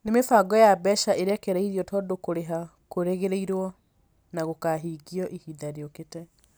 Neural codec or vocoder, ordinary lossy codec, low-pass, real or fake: none; none; none; real